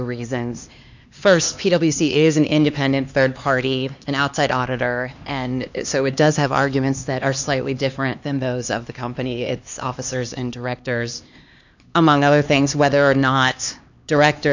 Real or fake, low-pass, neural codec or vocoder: fake; 7.2 kHz; codec, 16 kHz, 2 kbps, X-Codec, HuBERT features, trained on LibriSpeech